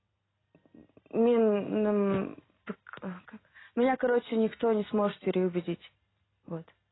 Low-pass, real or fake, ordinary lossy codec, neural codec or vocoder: 7.2 kHz; real; AAC, 16 kbps; none